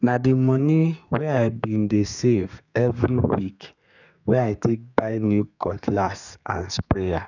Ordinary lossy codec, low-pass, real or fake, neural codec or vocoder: none; 7.2 kHz; fake; codec, 32 kHz, 1.9 kbps, SNAC